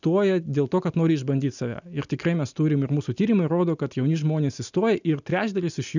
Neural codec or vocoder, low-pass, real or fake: none; 7.2 kHz; real